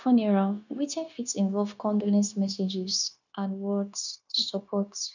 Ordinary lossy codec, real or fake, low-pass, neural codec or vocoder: none; fake; 7.2 kHz; codec, 16 kHz, 0.9 kbps, LongCat-Audio-Codec